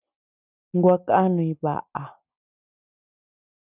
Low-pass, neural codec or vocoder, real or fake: 3.6 kHz; none; real